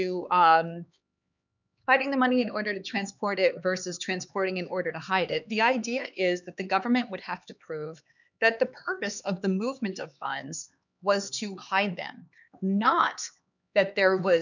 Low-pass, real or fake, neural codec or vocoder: 7.2 kHz; fake; codec, 16 kHz, 4 kbps, X-Codec, HuBERT features, trained on LibriSpeech